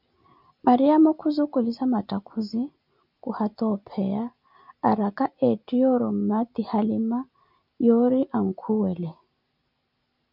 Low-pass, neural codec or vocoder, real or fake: 5.4 kHz; none; real